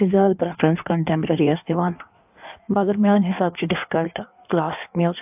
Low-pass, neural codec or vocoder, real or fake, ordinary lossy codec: 3.6 kHz; codec, 16 kHz in and 24 kHz out, 1.1 kbps, FireRedTTS-2 codec; fake; AAC, 32 kbps